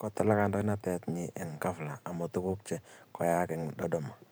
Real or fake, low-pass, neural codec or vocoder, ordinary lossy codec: fake; none; vocoder, 44.1 kHz, 128 mel bands every 512 samples, BigVGAN v2; none